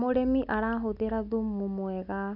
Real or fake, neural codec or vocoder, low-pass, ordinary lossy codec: real; none; 5.4 kHz; none